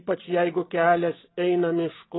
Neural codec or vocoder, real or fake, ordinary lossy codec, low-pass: none; real; AAC, 16 kbps; 7.2 kHz